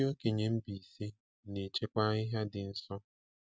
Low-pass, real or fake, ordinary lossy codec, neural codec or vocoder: none; real; none; none